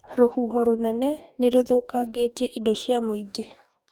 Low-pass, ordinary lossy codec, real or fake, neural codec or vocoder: 19.8 kHz; none; fake; codec, 44.1 kHz, 2.6 kbps, DAC